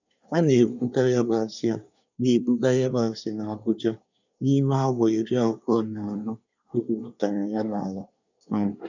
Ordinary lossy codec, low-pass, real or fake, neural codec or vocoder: none; 7.2 kHz; fake; codec, 24 kHz, 1 kbps, SNAC